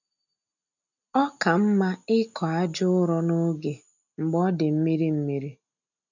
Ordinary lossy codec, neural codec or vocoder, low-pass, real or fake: none; none; 7.2 kHz; real